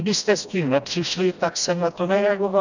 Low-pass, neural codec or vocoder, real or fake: 7.2 kHz; codec, 16 kHz, 1 kbps, FreqCodec, smaller model; fake